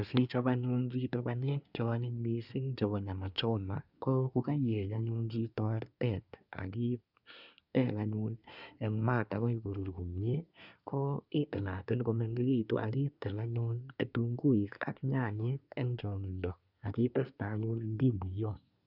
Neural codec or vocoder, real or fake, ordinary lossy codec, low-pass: codec, 24 kHz, 1 kbps, SNAC; fake; none; 5.4 kHz